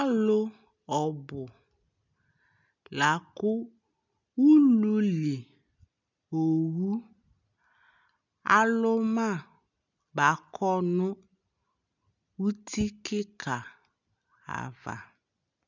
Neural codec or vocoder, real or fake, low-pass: none; real; 7.2 kHz